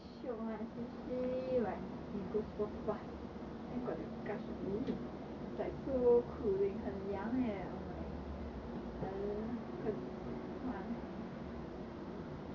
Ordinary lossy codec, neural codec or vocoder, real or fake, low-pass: none; none; real; 7.2 kHz